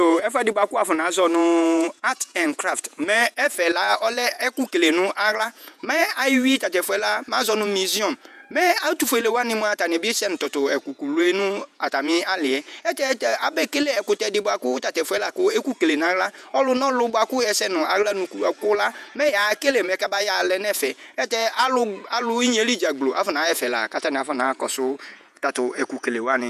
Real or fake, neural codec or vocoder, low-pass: fake; vocoder, 48 kHz, 128 mel bands, Vocos; 14.4 kHz